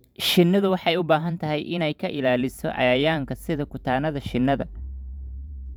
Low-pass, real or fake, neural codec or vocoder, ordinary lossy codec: none; fake; vocoder, 44.1 kHz, 128 mel bands every 512 samples, BigVGAN v2; none